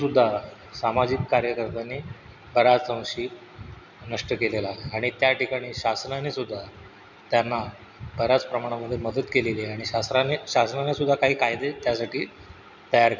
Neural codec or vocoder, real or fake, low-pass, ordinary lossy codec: none; real; 7.2 kHz; none